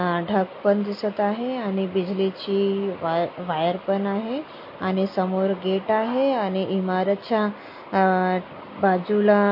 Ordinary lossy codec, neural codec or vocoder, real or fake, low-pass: MP3, 32 kbps; none; real; 5.4 kHz